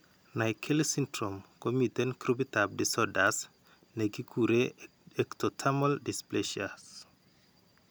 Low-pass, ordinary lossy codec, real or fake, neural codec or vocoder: none; none; real; none